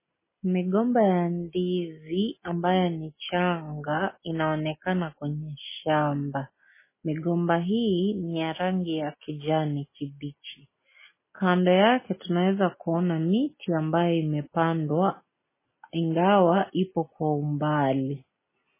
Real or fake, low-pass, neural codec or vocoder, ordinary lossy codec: real; 3.6 kHz; none; MP3, 16 kbps